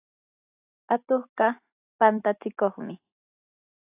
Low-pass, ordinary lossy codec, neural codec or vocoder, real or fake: 3.6 kHz; AAC, 32 kbps; none; real